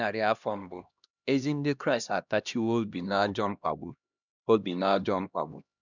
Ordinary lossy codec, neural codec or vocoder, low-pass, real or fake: none; codec, 16 kHz, 1 kbps, X-Codec, HuBERT features, trained on LibriSpeech; 7.2 kHz; fake